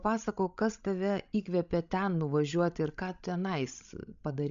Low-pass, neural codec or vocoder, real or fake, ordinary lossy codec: 7.2 kHz; codec, 16 kHz, 16 kbps, FreqCodec, larger model; fake; MP3, 64 kbps